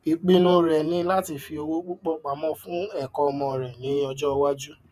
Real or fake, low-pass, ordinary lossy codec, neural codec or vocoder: fake; 14.4 kHz; none; vocoder, 48 kHz, 128 mel bands, Vocos